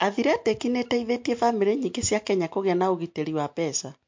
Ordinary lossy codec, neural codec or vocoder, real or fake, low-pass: MP3, 48 kbps; none; real; 7.2 kHz